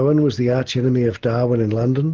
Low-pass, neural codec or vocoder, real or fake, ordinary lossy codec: 7.2 kHz; none; real; Opus, 16 kbps